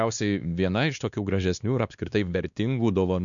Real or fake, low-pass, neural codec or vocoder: fake; 7.2 kHz; codec, 16 kHz, 2 kbps, X-Codec, WavLM features, trained on Multilingual LibriSpeech